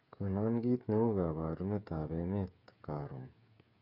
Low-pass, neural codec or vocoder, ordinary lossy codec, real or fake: 5.4 kHz; codec, 16 kHz, 8 kbps, FreqCodec, smaller model; AAC, 48 kbps; fake